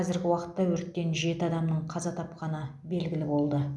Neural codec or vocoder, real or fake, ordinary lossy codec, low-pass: none; real; none; none